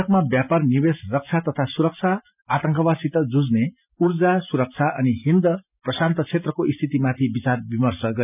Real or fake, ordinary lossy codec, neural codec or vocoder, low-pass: real; none; none; 3.6 kHz